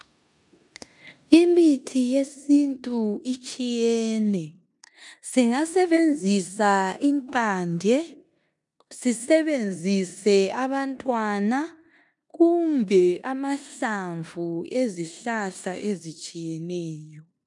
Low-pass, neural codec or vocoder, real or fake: 10.8 kHz; codec, 16 kHz in and 24 kHz out, 0.9 kbps, LongCat-Audio-Codec, four codebook decoder; fake